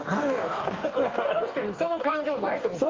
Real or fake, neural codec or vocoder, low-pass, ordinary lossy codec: fake; codec, 44.1 kHz, 2.6 kbps, DAC; 7.2 kHz; Opus, 24 kbps